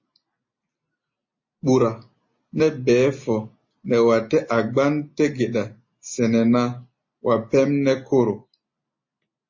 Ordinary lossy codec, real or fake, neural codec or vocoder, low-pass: MP3, 32 kbps; real; none; 7.2 kHz